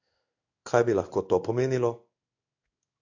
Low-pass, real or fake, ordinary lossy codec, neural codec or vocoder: 7.2 kHz; fake; AAC, 48 kbps; codec, 16 kHz in and 24 kHz out, 1 kbps, XY-Tokenizer